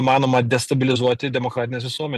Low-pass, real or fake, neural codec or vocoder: 14.4 kHz; real; none